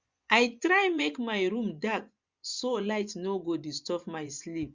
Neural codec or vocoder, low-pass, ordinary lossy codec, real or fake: vocoder, 24 kHz, 100 mel bands, Vocos; 7.2 kHz; Opus, 64 kbps; fake